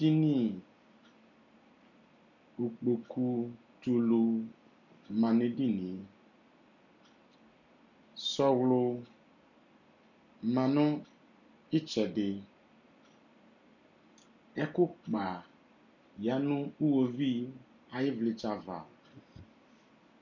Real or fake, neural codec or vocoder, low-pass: real; none; 7.2 kHz